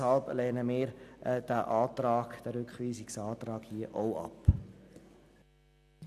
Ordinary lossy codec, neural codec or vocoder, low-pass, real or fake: none; none; 14.4 kHz; real